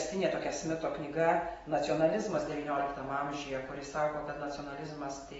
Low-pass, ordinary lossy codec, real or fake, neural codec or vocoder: 19.8 kHz; AAC, 24 kbps; real; none